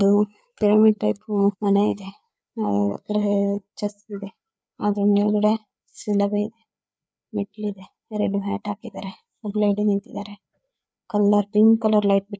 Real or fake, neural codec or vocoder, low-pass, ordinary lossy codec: fake; codec, 16 kHz, 4 kbps, FreqCodec, larger model; none; none